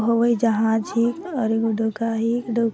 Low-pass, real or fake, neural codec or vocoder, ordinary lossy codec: none; real; none; none